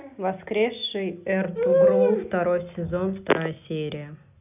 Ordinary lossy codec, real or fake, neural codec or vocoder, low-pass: none; real; none; 3.6 kHz